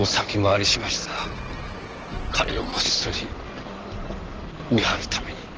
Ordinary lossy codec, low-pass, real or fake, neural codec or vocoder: Opus, 16 kbps; 7.2 kHz; fake; codec, 16 kHz in and 24 kHz out, 2.2 kbps, FireRedTTS-2 codec